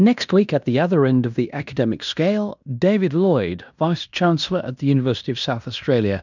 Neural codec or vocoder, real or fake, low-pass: codec, 16 kHz in and 24 kHz out, 0.9 kbps, LongCat-Audio-Codec, fine tuned four codebook decoder; fake; 7.2 kHz